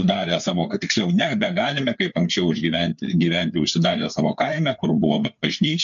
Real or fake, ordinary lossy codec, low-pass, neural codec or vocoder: fake; MP3, 64 kbps; 7.2 kHz; codec, 16 kHz, 4 kbps, FreqCodec, larger model